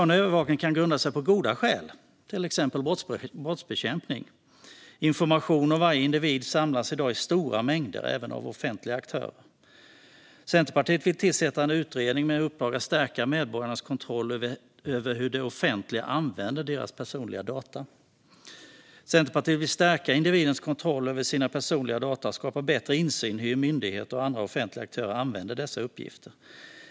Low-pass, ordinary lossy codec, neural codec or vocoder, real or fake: none; none; none; real